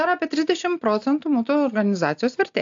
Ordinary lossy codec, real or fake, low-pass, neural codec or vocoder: AAC, 64 kbps; real; 7.2 kHz; none